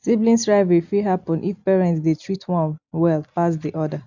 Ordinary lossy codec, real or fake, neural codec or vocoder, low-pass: none; real; none; 7.2 kHz